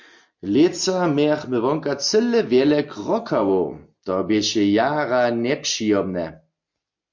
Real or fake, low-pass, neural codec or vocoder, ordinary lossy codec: real; 7.2 kHz; none; MP3, 48 kbps